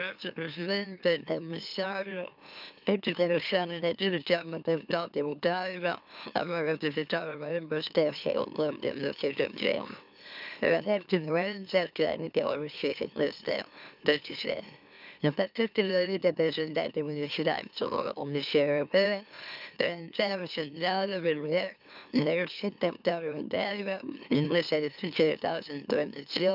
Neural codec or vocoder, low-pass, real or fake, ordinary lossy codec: autoencoder, 44.1 kHz, a latent of 192 numbers a frame, MeloTTS; 5.4 kHz; fake; none